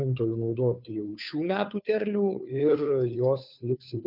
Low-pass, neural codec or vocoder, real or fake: 5.4 kHz; codec, 16 kHz, 2 kbps, FunCodec, trained on Chinese and English, 25 frames a second; fake